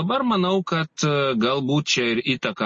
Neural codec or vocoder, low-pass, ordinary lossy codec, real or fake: none; 10.8 kHz; MP3, 32 kbps; real